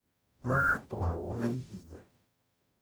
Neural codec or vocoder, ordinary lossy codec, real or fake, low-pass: codec, 44.1 kHz, 0.9 kbps, DAC; none; fake; none